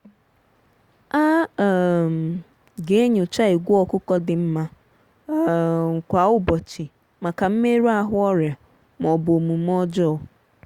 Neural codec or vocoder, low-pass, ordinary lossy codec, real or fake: none; 19.8 kHz; Opus, 64 kbps; real